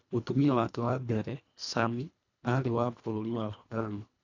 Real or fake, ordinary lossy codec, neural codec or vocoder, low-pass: fake; none; codec, 24 kHz, 1.5 kbps, HILCodec; 7.2 kHz